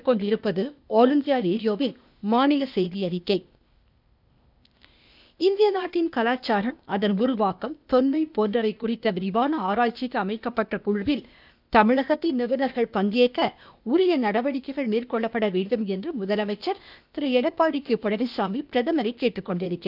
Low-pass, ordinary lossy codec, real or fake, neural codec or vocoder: 5.4 kHz; none; fake; codec, 16 kHz, 0.8 kbps, ZipCodec